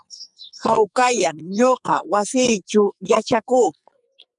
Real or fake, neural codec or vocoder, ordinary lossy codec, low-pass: fake; codec, 44.1 kHz, 2.6 kbps, SNAC; MP3, 96 kbps; 10.8 kHz